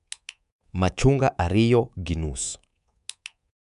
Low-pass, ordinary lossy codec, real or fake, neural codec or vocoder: 10.8 kHz; none; fake; codec, 24 kHz, 3.1 kbps, DualCodec